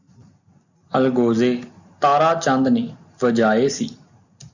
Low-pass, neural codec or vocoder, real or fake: 7.2 kHz; none; real